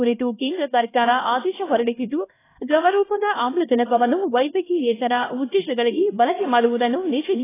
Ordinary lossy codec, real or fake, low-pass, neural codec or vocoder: AAC, 16 kbps; fake; 3.6 kHz; codec, 16 kHz, 1 kbps, X-Codec, HuBERT features, trained on LibriSpeech